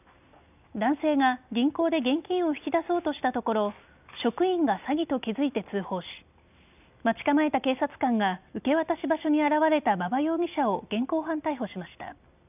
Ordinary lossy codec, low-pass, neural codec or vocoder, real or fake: none; 3.6 kHz; none; real